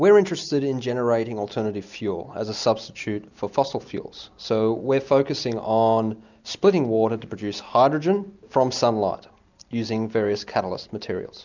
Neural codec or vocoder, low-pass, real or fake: none; 7.2 kHz; real